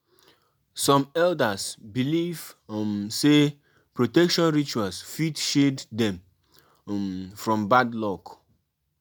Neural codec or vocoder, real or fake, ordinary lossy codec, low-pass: none; real; none; none